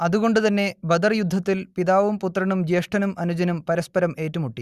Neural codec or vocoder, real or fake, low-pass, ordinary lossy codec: none; real; 14.4 kHz; Opus, 64 kbps